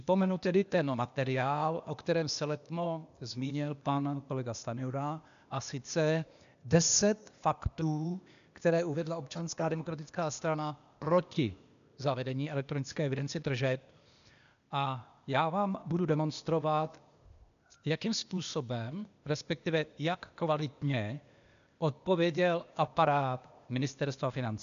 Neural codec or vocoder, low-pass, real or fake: codec, 16 kHz, 0.8 kbps, ZipCodec; 7.2 kHz; fake